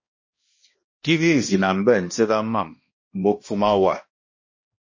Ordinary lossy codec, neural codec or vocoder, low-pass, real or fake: MP3, 32 kbps; codec, 16 kHz, 1 kbps, X-Codec, HuBERT features, trained on balanced general audio; 7.2 kHz; fake